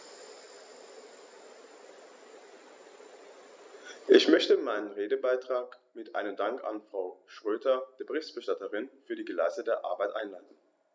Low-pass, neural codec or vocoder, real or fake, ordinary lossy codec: none; none; real; none